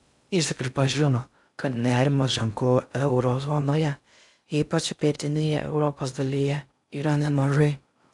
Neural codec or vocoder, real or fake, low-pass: codec, 16 kHz in and 24 kHz out, 0.6 kbps, FocalCodec, streaming, 2048 codes; fake; 10.8 kHz